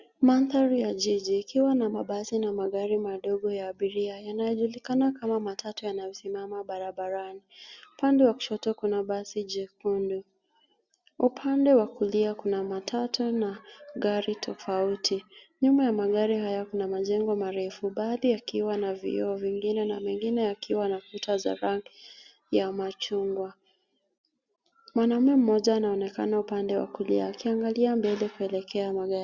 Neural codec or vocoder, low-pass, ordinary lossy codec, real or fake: none; 7.2 kHz; Opus, 64 kbps; real